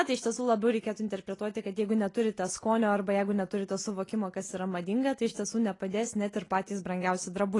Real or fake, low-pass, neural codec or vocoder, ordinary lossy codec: real; 10.8 kHz; none; AAC, 32 kbps